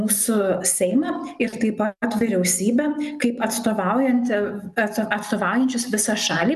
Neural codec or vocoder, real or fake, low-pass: none; real; 14.4 kHz